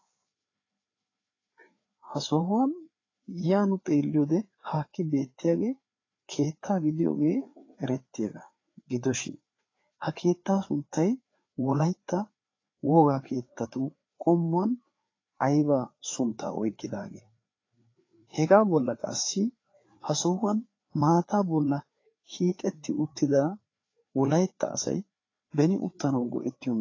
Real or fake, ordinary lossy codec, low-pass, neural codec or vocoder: fake; AAC, 32 kbps; 7.2 kHz; codec, 16 kHz, 4 kbps, FreqCodec, larger model